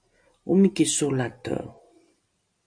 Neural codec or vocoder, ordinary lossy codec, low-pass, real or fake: none; MP3, 96 kbps; 9.9 kHz; real